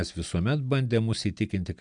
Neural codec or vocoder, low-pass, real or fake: none; 9.9 kHz; real